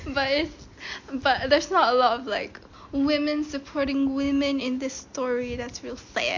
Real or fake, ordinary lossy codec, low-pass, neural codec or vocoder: real; MP3, 48 kbps; 7.2 kHz; none